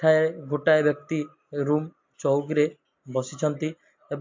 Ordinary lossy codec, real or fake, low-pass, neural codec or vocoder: MP3, 48 kbps; real; 7.2 kHz; none